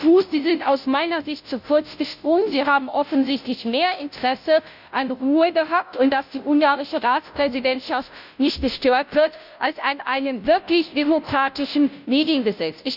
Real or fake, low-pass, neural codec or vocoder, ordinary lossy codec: fake; 5.4 kHz; codec, 16 kHz, 0.5 kbps, FunCodec, trained on Chinese and English, 25 frames a second; none